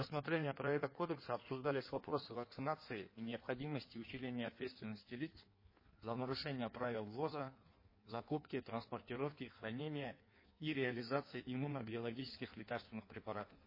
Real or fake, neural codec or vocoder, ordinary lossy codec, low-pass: fake; codec, 16 kHz in and 24 kHz out, 1.1 kbps, FireRedTTS-2 codec; MP3, 24 kbps; 5.4 kHz